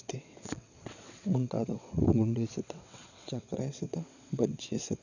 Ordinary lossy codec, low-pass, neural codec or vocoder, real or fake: none; 7.2 kHz; vocoder, 22.05 kHz, 80 mel bands, WaveNeXt; fake